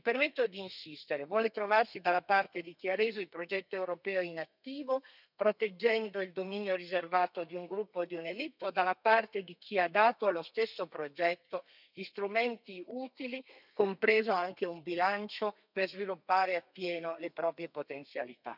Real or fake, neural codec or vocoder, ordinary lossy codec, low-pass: fake; codec, 32 kHz, 1.9 kbps, SNAC; none; 5.4 kHz